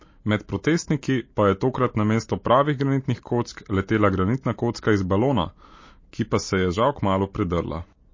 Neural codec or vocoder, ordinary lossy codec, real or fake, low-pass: none; MP3, 32 kbps; real; 7.2 kHz